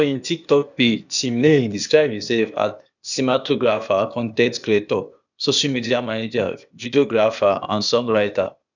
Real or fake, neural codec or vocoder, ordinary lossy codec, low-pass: fake; codec, 16 kHz, 0.8 kbps, ZipCodec; none; 7.2 kHz